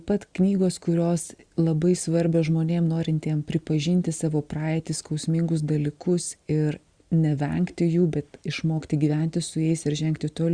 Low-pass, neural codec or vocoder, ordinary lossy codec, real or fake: 9.9 kHz; none; Opus, 64 kbps; real